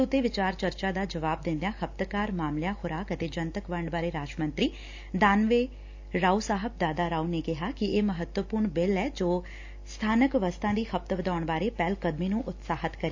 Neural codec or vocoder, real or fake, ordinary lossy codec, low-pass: none; real; AAC, 48 kbps; 7.2 kHz